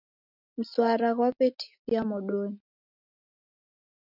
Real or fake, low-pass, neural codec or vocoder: real; 5.4 kHz; none